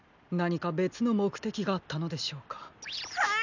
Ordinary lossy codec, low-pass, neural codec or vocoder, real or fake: none; 7.2 kHz; none; real